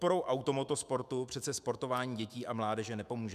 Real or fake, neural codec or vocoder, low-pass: fake; autoencoder, 48 kHz, 128 numbers a frame, DAC-VAE, trained on Japanese speech; 14.4 kHz